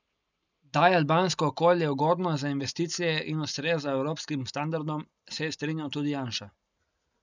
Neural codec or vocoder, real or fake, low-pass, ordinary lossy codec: none; real; 7.2 kHz; none